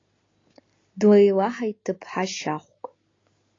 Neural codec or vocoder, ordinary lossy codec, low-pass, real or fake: none; AAC, 32 kbps; 7.2 kHz; real